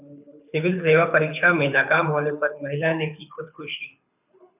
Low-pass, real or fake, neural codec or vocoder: 3.6 kHz; fake; codec, 24 kHz, 6 kbps, HILCodec